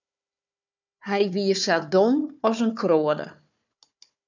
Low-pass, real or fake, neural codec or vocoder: 7.2 kHz; fake; codec, 16 kHz, 4 kbps, FunCodec, trained on Chinese and English, 50 frames a second